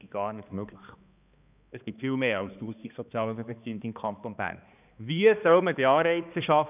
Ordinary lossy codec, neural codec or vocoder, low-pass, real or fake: none; codec, 16 kHz, 2 kbps, X-Codec, HuBERT features, trained on balanced general audio; 3.6 kHz; fake